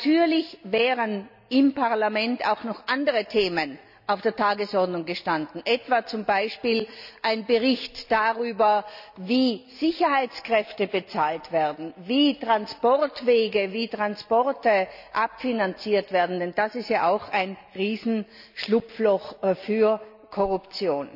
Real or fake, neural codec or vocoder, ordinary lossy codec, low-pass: real; none; none; 5.4 kHz